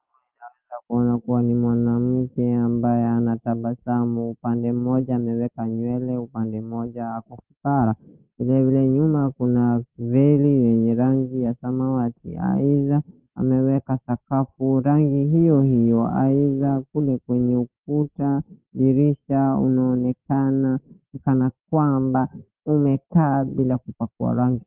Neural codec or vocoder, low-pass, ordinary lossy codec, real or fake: none; 3.6 kHz; Opus, 16 kbps; real